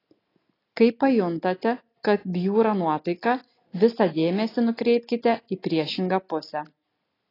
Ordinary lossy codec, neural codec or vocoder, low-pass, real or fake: AAC, 24 kbps; none; 5.4 kHz; real